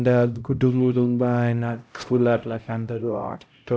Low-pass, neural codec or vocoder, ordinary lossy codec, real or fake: none; codec, 16 kHz, 0.5 kbps, X-Codec, HuBERT features, trained on LibriSpeech; none; fake